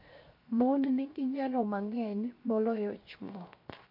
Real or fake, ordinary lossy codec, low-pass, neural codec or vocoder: fake; MP3, 32 kbps; 5.4 kHz; codec, 16 kHz, 0.7 kbps, FocalCodec